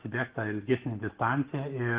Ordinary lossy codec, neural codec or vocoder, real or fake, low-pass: Opus, 16 kbps; none; real; 3.6 kHz